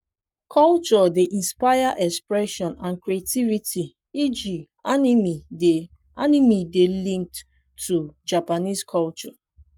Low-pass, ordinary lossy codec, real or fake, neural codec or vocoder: 19.8 kHz; none; fake; codec, 44.1 kHz, 7.8 kbps, Pupu-Codec